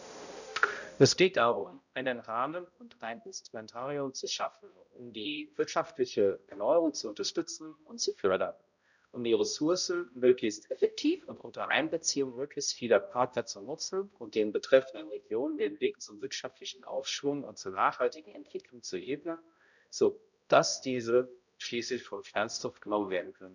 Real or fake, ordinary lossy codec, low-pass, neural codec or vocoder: fake; none; 7.2 kHz; codec, 16 kHz, 0.5 kbps, X-Codec, HuBERT features, trained on balanced general audio